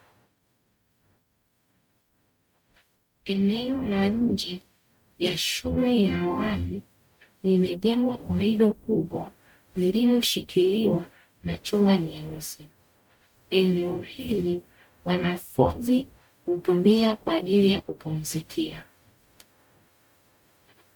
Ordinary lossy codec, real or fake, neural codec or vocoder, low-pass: MP3, 96 kbps; fake; codec, 44.1 kHz, 0.9 kbps, DAC; 19.8 kHz